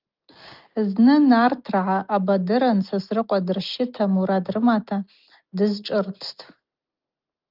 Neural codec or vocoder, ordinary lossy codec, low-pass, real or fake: none; Opus, 32 kbps; 5.4 kHz; real